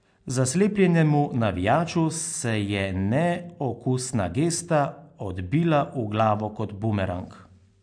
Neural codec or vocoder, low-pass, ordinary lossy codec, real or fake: none; 9.9 kHz; AAC, 64 kbps; real